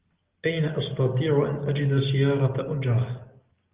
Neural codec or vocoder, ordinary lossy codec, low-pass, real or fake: none; Opus, 32 kbps; 3.6 kHz; real